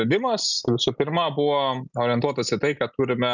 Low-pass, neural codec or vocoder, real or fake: 7.2 kHz; none; real